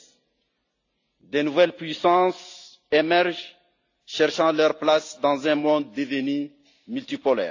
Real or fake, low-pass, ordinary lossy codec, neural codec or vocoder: real; 7.2 kHz; AAC, 48 kbps; none